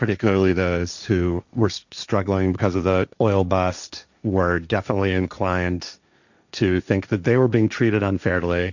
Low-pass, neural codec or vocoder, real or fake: 7.2 kHz; codec, 16 kHz, 1.1 kbps, Voila-Tokenizer; fake